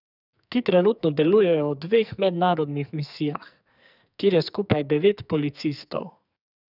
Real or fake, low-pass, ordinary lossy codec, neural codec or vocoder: fake; 5.4 kHz; none; codec, 44.1 kHz, 2.6 kbps, SNAC